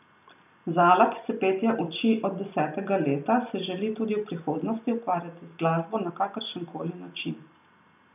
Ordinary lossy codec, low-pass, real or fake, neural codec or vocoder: none; 3.6 kHz; real; none